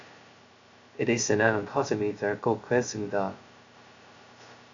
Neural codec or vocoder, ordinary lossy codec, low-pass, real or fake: codec, 16 kHz, 0.2 kbps, FocalCodec; Opus, 64 kbps; 7.2 kHz; fake